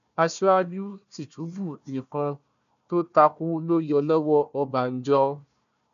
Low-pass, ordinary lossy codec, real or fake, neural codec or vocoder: 7.2 kHz; none; fake; codec, 16 kHz, 1 kbps, FunCodec, trained on Chinese and English, 50 frames a second